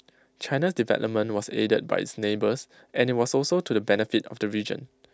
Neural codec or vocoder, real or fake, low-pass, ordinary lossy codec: none; real; none; none